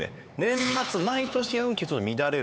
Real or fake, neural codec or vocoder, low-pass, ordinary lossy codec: fake; codec, 16 kHz, 4 kbps, X-Codec, HuBERT features, trained on LibriSpeech; none; none